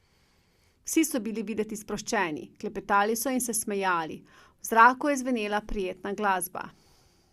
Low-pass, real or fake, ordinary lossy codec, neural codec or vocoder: 14.4 kHz; real; Opus, 64 kbps; none